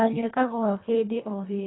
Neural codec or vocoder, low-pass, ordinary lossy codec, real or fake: codec, 24 kHz, 1.5 kbps, HILCodec; 7.2 kHz; AAC, 16 kbps; fake